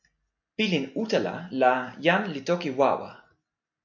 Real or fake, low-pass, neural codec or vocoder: real; 7.2 kHz; none